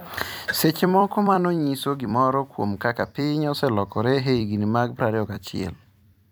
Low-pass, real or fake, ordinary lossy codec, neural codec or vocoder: none; fake; none; vocoder, 44.1 kHz, 128 mel bands every 256 samples, BigVGAN v2